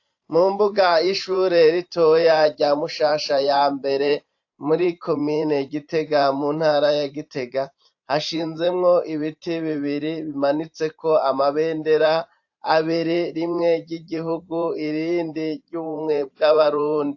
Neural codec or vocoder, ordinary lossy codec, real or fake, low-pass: vocoder, 44.1 kHz, 128 mel bands every 512 samples, BigVGAN v2; AAC, 48 kbps; fake; 7.2 kHz